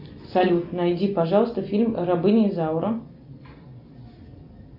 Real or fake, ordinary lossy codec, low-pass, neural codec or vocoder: real; AAC, 48 kbps; 5.4 kHz; none